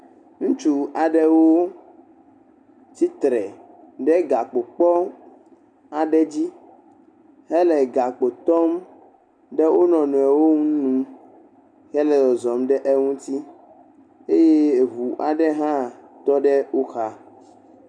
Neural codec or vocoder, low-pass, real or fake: none; 9.9 kHz; real